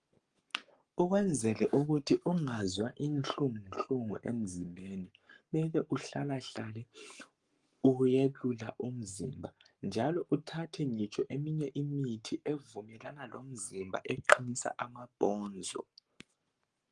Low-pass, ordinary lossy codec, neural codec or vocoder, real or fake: 10.8 kHz; Opus, 32 kbps; codec, 44.1 kHz, 7.8 kbps, Pupu-Codec; fake